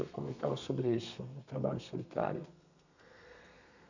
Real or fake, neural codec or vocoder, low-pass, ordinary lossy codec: fake; codec, 32 kHz, 1.9 kbps, SNAC; 7.2 kHz; none